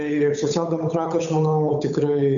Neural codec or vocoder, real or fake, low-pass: codec, 16 kHz, 8 kbps, FunCodec, trained on Chinese and English, 25 frames a second; fake; 7.2 kHz